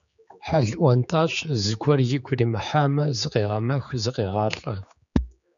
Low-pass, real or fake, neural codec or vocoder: 7.2 kHz; fake; codec, 16 kHz, 4 kbps, X-Codec, HuBERT features, trained on general audio